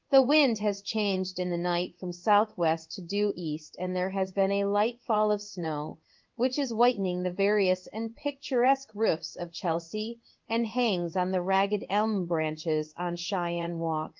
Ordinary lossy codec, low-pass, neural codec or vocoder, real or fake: Opus, 32 kbps; 7.2 kHz; codec, 16 kHz in and 24 kHz out, 1 kbps, XY-Tokenizer; fake